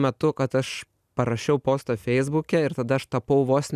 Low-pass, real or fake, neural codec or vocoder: 14.4 kHz; real; none